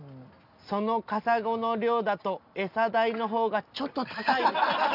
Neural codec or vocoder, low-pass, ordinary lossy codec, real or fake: none; 5.4 kHz; none; real